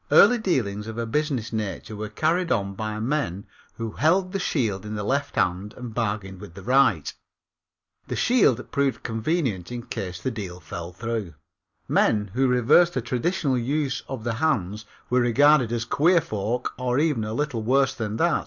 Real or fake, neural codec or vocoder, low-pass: real; none; 7.2 kHz